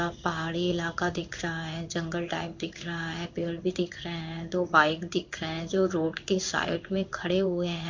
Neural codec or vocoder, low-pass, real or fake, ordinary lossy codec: codec, 16 kHz in and 24 kHz out, 1 kbps, XY-Tokenizer; 7.2 kHz; fake; MP3, 64 kbps